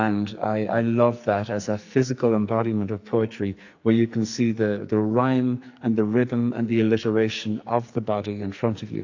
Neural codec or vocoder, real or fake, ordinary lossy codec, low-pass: codec, 44.1 kHz, 2.6 kbps, SNAC; fake; AAC, 48 kbps; 7.2 kHz